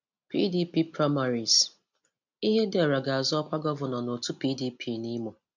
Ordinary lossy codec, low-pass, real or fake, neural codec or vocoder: none; 7.2 kHz; real; none